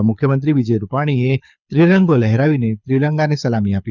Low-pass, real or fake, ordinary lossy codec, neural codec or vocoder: 7.2 kHz; fake; none; codec, 24 kHz, 6 kbps, HILCodec